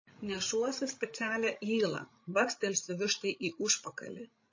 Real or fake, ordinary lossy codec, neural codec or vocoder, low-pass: fake; MP3, 32 kbps; codec, 16 kHz, 16 kbps, FreqCodec, larger model; 7.2 kHz